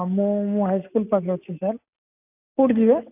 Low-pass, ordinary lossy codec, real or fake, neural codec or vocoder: 3.6 kHz; AAC, 32 kbps; real; none